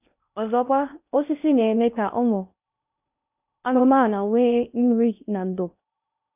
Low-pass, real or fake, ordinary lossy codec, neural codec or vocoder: 3.6 kHz; fake; none; codec, 16 kHz in and 24 kHz out, 0.6 kbps, FocalCodec, streaming, 2048 codes